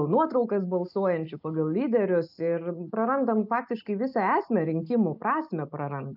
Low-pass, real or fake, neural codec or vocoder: 5.4 kHz; real; none